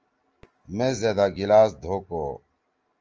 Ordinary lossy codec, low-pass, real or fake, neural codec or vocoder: Opus, 24 kbps; 7.2 kHz; real; none